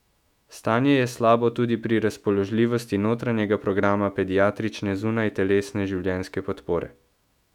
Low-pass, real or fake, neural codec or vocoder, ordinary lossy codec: 19.8 kHz; fake; autoencoder, 48 kHz, 128 numbers a frame, DAC-VAE, trained on Japanese speech; none